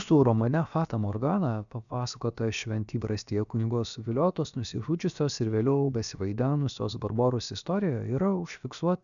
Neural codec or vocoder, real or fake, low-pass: codec, 16 kHz, about 1 kbps, DyCAST, with the encoder's durations; fake; 7.2 kHz